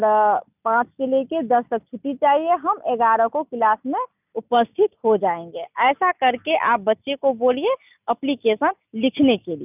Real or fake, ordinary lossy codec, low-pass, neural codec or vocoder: real; none; 3.6 kHz; none